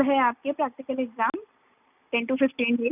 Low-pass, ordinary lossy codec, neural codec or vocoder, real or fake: 3.6 kHz; none; none; real